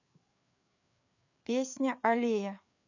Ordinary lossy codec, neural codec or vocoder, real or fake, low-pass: none; codec, 16 kHz, 4 kbps, FreqCodec, larger model; fake; 7.2 kHz